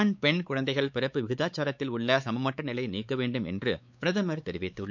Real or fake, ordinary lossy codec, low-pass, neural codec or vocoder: fake; none; 7.2 kHz; codec, 16 kHz, 4 kbps, X-Codec, WavLM features, trained on Multilingual LibriSpeech